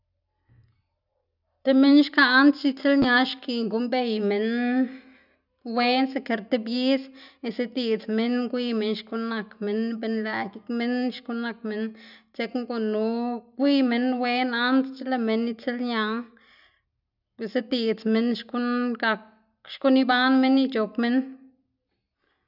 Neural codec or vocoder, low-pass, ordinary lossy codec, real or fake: none; 5.4 kHz; none; real